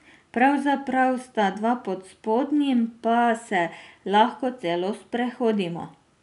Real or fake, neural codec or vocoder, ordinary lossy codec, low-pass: real; none; none; 10.8 kHz